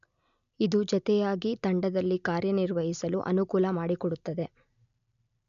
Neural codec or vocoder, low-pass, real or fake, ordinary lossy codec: none; 7.2 kHz; real; none